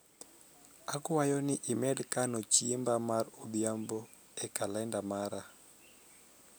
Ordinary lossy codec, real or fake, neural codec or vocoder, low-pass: none; real; none; none